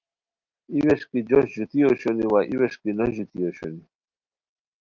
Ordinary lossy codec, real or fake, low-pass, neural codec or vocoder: Opus, 32 kbps; real; 7.2 kHz; none